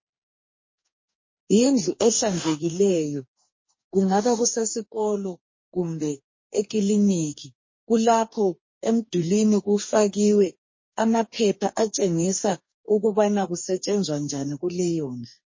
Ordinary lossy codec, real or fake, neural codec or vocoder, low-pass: MP3, 32 kbps; fake; codec, 44.1 kHz, 2.6 kbps, DAC; 7.2 kHz